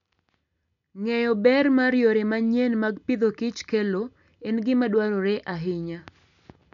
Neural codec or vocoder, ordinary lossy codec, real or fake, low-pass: none; none; real; 7.2 kHz